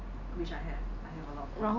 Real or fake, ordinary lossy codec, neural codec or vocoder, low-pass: real; none; none; 7.2 kHz